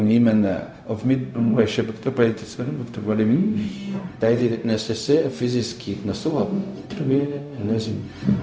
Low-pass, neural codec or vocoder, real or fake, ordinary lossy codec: none; codec, 16 kHz, 0.4 kbps, LongCat-Audio-Codec; fake; none